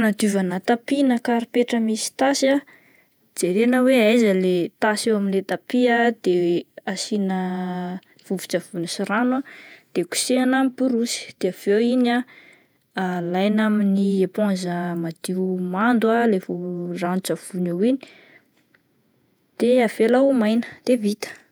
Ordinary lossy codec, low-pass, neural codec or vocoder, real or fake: none; none; vocoder, 48 kHz, 128 mel bands, Vocos; fake